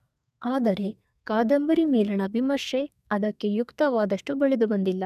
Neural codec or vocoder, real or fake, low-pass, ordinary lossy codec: codec, 32 kHz, 1.9 kbps, SNAC; fake; 14.4 kHz; none